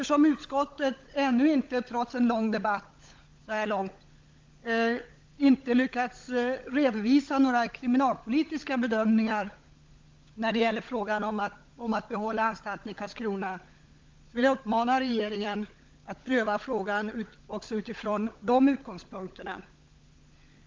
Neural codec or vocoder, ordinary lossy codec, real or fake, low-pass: codec, 16 kHz, 16 kbps, FunCodec, trained on LibriTTS, 50 frames a second; Opus, 32 kbps; fake; 7.2 kHz